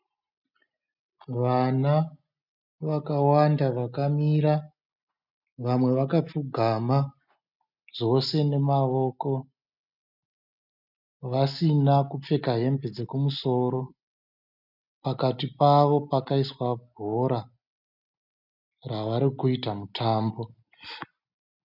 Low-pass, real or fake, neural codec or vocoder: 5.4 kHz; real; none